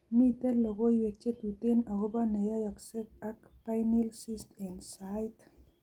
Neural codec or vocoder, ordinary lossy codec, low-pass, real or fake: none; Opus, 24 kbps; 19.8 kHz; real